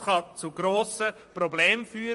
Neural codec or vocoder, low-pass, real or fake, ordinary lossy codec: vocoder, 44.1 kHz, 128 mel bands, Pupu-Vocoder; 14.4 kHz; fake; MP3, 48 kbps